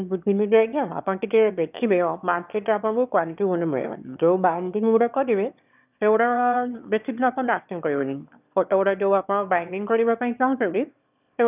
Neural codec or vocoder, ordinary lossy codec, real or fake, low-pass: autoencoder, 22.05 kHz, a latent of 192 numbers a frame, VITS, trained on one speaker; none; fake; 3.6 kHz